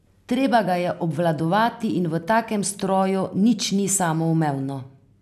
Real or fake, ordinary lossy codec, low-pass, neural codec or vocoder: real; none; 14.4 kHz; none